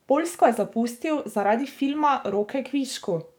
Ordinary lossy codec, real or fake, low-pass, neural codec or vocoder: none; fake; none; codec, 44.1 kHz, 7.8 kbps, DAC